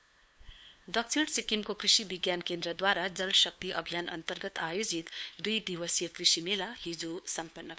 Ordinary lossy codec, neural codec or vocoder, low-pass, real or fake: none; codec, 16 kHz, 2 kbps, FunCodec, trained on LibriTTS, 25 frames a second; none; fake